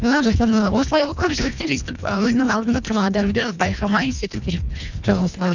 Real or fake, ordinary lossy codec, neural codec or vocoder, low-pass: fake; none; codec, 24 kHz, 1.5 kbps, HILCodec; 7.2 kHz